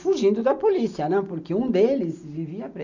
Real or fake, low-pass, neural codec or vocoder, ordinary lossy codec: real; 7.2 kHz; none; none